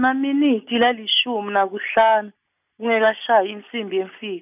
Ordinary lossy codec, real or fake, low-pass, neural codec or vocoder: none; real; 3.6 kHz; none